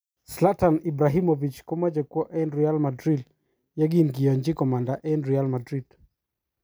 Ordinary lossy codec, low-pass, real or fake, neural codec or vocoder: none; none; real; none